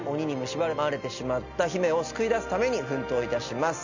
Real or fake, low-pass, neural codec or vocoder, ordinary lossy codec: real; 7.2 kHz; none; none